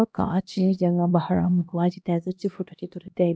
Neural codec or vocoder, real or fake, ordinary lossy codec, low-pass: codec, 16 kHz, 1 kbps, X-Codec, HuBERT features, trained on LibriSpeech; fake; none; none